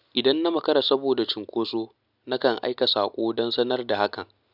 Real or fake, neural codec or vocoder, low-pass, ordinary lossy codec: real; none; 5.4 kHz; none